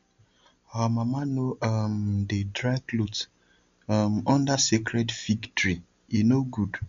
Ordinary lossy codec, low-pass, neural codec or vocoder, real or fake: MP3, 64 kbps; 7.2 kHz; none; real